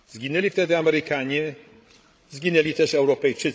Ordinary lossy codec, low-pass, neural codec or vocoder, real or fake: none; none; codec, 16 kHz, 16 kbps, FreqCodec, larger model; fake